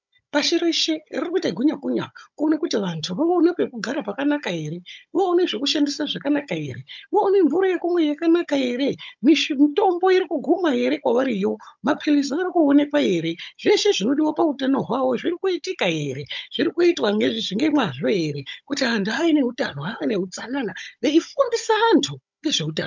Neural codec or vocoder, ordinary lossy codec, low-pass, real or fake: codec, 16 kHz, 16 kbps, FunCodec, trained on Chinese and English, 50 frames a second; MP3, 64 kbps; 7.2 kHz; fake